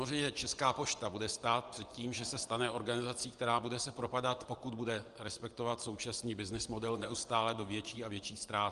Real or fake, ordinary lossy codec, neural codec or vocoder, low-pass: fake; Opus, 32 kbps; vocoder, 24 kHz, 100 mel bands, Vocos; 10.8 kHz